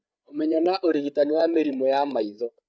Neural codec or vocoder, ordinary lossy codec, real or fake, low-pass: codec, 16 kHz, 8 kbps, FreqCodec, larger model; none; fake; none